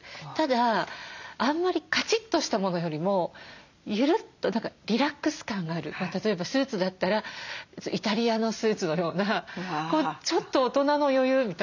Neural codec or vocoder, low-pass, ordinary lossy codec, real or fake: none; 7.2 kHz; none; real